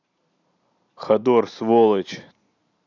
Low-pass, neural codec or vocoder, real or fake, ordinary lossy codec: 7.2 kHz; none; real; none